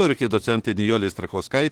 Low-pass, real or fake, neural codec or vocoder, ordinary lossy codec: 19.8 kHz; fake; autoencoder, 48 kHz, 32 numbers a frame, DAC-VAE, trained on Japanese speech; Opus, 16 kbps